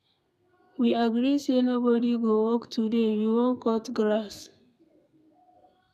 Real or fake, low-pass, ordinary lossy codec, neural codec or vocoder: fake; 14.4 kHz; none; codec, 32 kHz, 1.9 kbps, SNAC